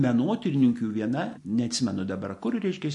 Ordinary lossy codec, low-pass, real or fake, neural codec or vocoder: MP3, 48 kbps; 10.8 kHz; real; none